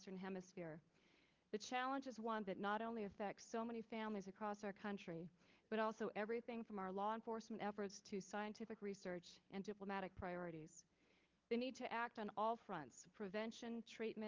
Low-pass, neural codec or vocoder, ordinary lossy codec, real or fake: 7.2 kHz; codec, 16 kHz, 16 kbps, FunCodec, trained on LibriTTS, 50 frames a second; Opus, 32 kbps; fake